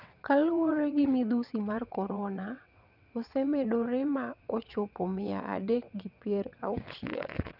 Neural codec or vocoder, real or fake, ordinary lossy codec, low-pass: vocoder, 22.05 kHz, 80 mel bands, WaveNeXt; fake; none; 5.4 kHz